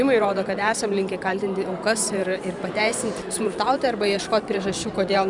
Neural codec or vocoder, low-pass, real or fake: vocoder, 44.1 kHz, 128 mel bands every 512 samples, BigVGAN v2; 10.8 kHz; fake